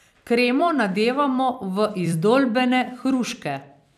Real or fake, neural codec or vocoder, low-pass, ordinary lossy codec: fake; vocoder, 44.1 kHz, 128 mel bands every 256 samples, BigVGAN v2; 14.4 kHz; none